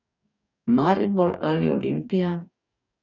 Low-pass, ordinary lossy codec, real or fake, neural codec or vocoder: 7.2 kHz; none; fake; codec, 44.1 kHz, 2.6 kbps, DAC